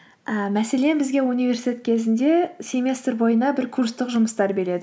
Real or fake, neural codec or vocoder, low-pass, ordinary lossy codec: real; none; none; none